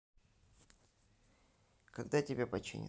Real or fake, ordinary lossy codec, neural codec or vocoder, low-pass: real; none; none; none